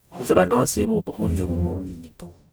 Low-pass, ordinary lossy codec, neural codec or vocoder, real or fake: none; none; codec, 44.1 kHz, 0.9 kbps, DAC; fake